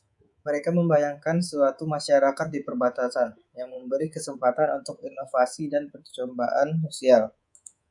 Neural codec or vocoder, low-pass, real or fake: codec, 24 kHz, 3.1 kbps, DualCodec; 10.8 kHz; fake